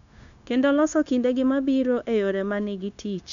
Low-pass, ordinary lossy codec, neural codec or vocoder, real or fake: 7.2 kHz; none; codec, 16 kHz, 0.9 kbps, LongCat-Audio-Codec; fake